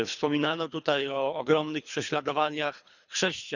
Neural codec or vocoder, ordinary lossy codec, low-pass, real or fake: codec, 24 kHz, 3 kbps, HILCodec; none; 7.2 kHz; fake